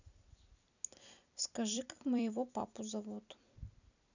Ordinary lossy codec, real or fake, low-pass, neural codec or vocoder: none; fake; 7.2 kHz; vocoder, 44.1 kHz, 128 mel bands every 256 samples, BigVGAN v2